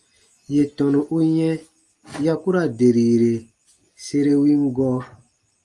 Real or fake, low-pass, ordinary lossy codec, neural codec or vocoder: real; 10.8 kHz; Opus, 32 kbps; none